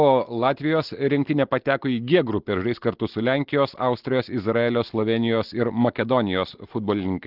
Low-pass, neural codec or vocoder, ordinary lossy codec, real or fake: 5.4 kHz; none; Opus, 16 kbps; real